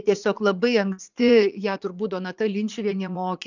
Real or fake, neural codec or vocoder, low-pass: fake; vocoder, 44.1 kHz, 80 mel bands, Vocos; 7.2 kHz